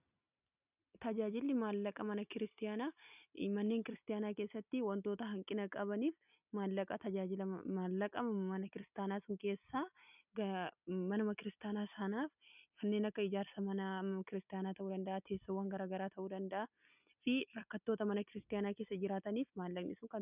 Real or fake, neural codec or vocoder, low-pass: real; none; 3.6 kHz